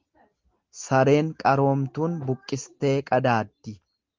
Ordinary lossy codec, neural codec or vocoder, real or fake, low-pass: Opus, 32 kbps; none; real; 7.2 kHz